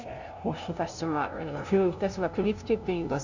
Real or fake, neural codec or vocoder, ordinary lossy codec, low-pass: fake; codec, 16 kHz, 0.5 kbps, FunCodec, trained on LibriTTS, 25 frames a second; none; 7.2 kHz